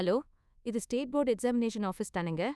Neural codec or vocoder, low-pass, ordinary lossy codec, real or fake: codec, 24 kHz, 1.2 kbps, DualCodec; none; none; fake